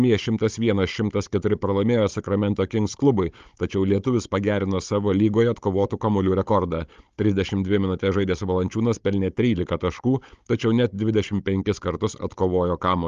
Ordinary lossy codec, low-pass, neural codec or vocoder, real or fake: Opus, 32 kbps; 7.2 kHz; codec, 16 kHz, 16 kbps, FunCodec, trained on Chinese and English, 50 frames a second; fake